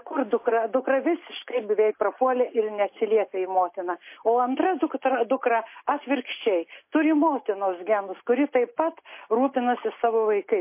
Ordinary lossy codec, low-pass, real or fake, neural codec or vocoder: MP3, 24 kbps; 3.6 kHz; real; none